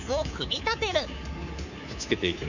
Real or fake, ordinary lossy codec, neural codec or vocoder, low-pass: fake; none; codec, 24 kHz, 3.1 kbps, DualCodec; 7.2 kHz